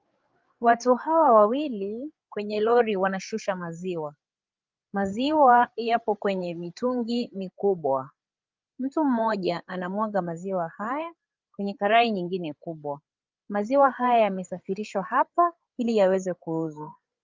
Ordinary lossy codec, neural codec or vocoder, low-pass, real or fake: Opus, 24 kbps; codec, 16 kHz, 8 kbps, FreqCodec, larger model; 7.2 kHz; fake